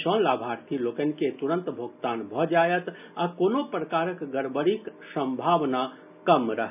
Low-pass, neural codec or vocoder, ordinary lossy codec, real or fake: 3.6 kHz; none; none; real